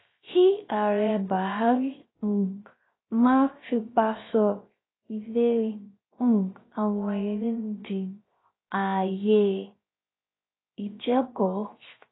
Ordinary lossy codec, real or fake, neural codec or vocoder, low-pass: AAC, 16 kbps; fake; codec, 16 kHz, 0.3 kbps, FocalCodec; 7.2 kHz